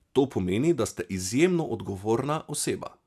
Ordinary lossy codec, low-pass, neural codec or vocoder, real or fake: AAC, 96 kbps; 14.4 kHz; none; real